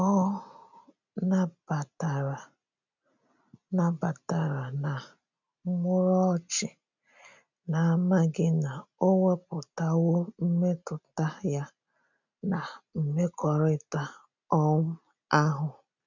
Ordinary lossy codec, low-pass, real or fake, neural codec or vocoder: none; 7.2 kHz; real; none